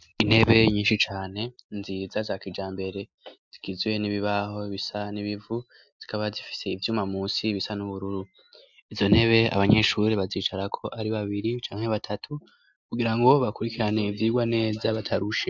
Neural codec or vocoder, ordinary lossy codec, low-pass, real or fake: none; MP3, 64 kbps; 7.2 kHz; real